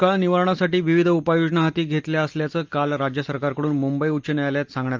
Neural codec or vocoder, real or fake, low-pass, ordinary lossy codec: none; real; 7.2 kHz; Opus, 24 kbps